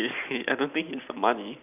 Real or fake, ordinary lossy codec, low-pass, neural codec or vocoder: real; none; 3.6 kHz; none